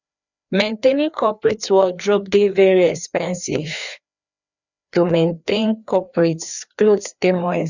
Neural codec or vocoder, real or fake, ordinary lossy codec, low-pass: codec, 16 kHz, 2 kbps, FreqCodec, larger model; fake; none; 7.2 kHz